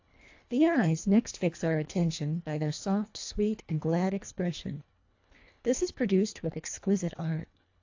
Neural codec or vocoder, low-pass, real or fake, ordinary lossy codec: codec, 24 kHz, 1.5 kbps, HILCodec; 7.2 kHz; fake; AAC, 48 kbps